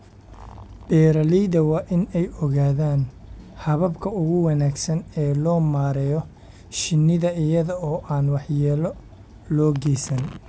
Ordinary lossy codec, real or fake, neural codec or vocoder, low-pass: none; real; none; none